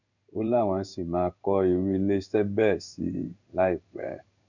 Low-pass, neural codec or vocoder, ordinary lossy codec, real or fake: 7.2 kHz; codec, 16 kHz in and 24 kHz out, 1 kbps, XY-Tokenizer; none; fake